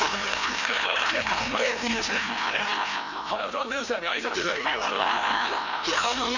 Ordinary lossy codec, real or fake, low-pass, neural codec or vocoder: none; fake; 7.2 kHz; codec, 16 kHz, 1 kbps, FreqCodec, larger model